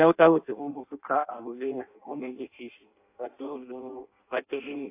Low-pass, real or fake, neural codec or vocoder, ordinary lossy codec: 3.6 kHz; fake; codec, 16 kHz in and 24 kHz out, 0.6 kbps, FireRedTTS-2 codec; AAC, 24 kbps